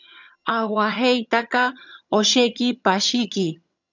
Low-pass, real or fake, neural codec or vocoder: 7.2 kHz; fake; vocoder, 22.05 kHz, 80 mel bands, HiFi-GAN